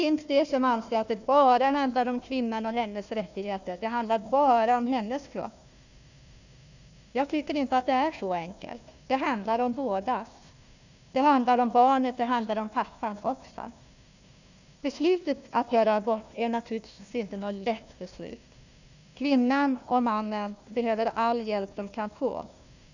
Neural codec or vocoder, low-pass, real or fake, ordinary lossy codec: codec, 16 kHz, 1 kbps, FunCodec, trained on Chinese and English, 50 frames a second; 7.2 kHz; fake; none